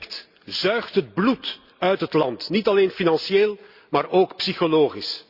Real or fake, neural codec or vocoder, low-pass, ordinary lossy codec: real; none; 5.4 kHz; Opus, 64 kbps